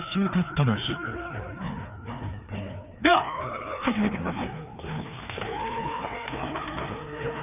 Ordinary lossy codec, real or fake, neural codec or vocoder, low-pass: none; fake; codec, 16 kHz, 2 kbps, FreqCodec, larger model; 3.6 kHz